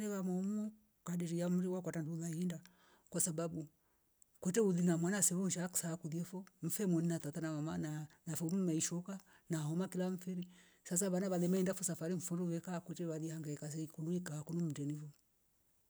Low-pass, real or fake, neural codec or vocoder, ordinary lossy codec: none; real; none; none